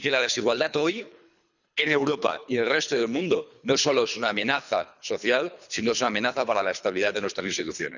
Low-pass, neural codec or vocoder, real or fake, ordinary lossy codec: 7.2 kHz; codec, 24 kHz, 3 kbps, HILCodec; fake; none